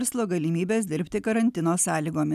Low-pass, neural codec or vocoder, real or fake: 14.4 kHz; none; real